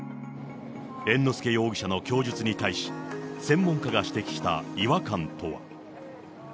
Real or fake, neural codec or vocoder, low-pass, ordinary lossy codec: real; none; none; none